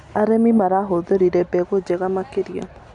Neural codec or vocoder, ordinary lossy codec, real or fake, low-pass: none; none; real; 9.9 kHz